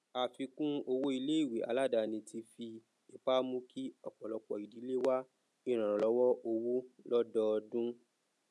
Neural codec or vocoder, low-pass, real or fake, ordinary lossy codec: none; none; real; none